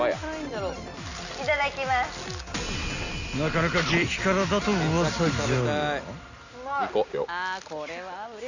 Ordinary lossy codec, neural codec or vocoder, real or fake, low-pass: none; none; real; 7.2 kHz